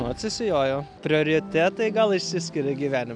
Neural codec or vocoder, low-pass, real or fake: none; 9.9 kHz; real